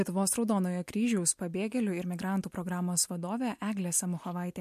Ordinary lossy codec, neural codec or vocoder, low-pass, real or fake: MP3, 64 kbps; none; 14.4 kHz; real